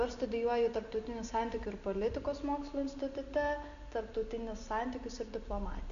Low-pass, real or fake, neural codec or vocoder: 7.2 kHz; real; none